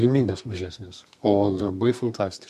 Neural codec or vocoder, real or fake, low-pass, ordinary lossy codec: codec, 32 kHz, 1.9 kbps, SNAC; fake; 14.4 kHz; MP3, 64 kbps